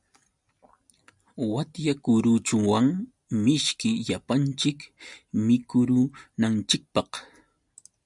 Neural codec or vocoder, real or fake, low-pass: none; real; 10.8 kHz